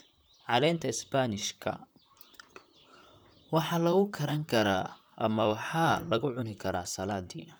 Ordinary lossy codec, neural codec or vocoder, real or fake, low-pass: none; vocoder, 44.1 kHz, 128 mel bands, Pupu-Vocoder; fake; none